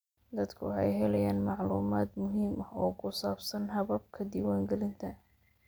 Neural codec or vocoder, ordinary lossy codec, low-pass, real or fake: none; none; none; real